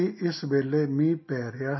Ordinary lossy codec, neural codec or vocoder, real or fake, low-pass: MP3, 24 kbps; none; real; 7.2 kHz